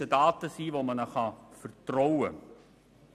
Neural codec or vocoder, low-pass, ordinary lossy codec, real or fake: none; 14.4 kHz; none; real